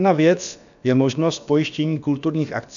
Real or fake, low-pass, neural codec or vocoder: fake; 7.2 kHz; codec, 16 kHz, about 1 kbps, DyCAST, with the encoder's durations